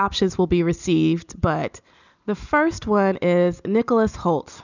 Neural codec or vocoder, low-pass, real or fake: none; 7.2 kHz; real